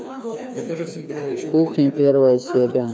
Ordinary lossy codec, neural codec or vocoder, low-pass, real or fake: none; codec, 16 kHz, 2 kbps, FreqCodec, larger model; none; fake